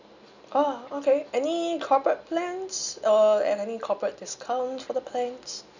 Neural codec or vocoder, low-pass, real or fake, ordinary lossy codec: none; 7.2 kHz; real; none